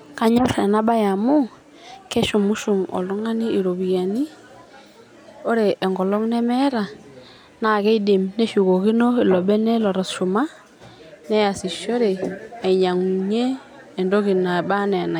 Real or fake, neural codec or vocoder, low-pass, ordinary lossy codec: real; none; 19.8 kHz; none